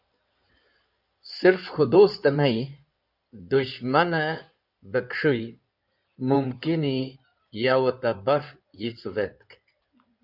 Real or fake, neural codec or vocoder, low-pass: fake; codec, 16 kHz in and 24 kHz out, 2.2 kbps, FireRedTTS-2 codec; 5.4 kHz